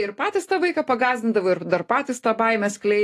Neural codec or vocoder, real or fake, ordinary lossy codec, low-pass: none; real; AAC, 48 kbps; 14.4 kHz